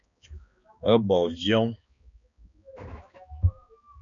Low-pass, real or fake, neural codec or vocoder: 7.2 kHz; fake; codec, 16 kHz, 2 kbps, X-Codec, HuBERT features, trained on balanced general audio